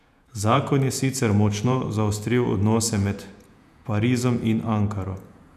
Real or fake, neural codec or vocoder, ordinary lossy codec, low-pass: fake; vocoder, 48 kHz, 128 mel bands, Vocos; none; 14.4 kHz